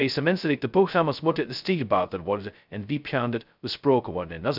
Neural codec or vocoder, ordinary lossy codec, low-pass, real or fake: codec, 16 kHz, 0.2 kbps, FocalCodec; none; 5.4 kHz; fake